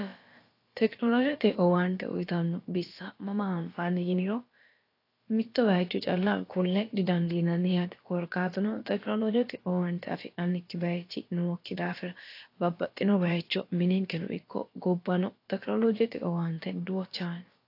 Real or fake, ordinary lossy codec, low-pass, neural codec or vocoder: fake; AAC, 32 kbps; 5.4 kHz; codec, 16 kHz, about 1 kbps, DyCAST, with the encoder's durations